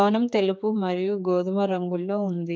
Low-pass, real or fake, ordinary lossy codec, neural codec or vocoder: none; fake; none; codec, 16 kHz, 4 kbps, X-Codec, HuBERT features, trained on general audio